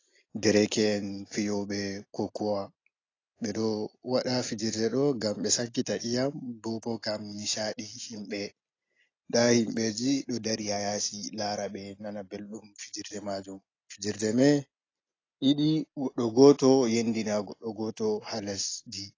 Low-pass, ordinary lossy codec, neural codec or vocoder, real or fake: 7.2 kHz; AAC, 32 kbps; none; real